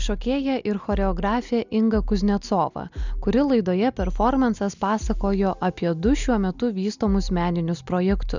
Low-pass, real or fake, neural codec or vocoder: 7.2 kHz; real; none